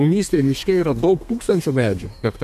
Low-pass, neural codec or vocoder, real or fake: 14.4 kHz; codec, 32 kHz, 1.9 kbps, SNAC; fake